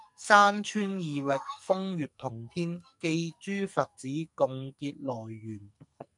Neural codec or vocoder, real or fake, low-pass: codec, 44.1 kHz, 2.6 kbps, SNAC; fake; 10.8 kHz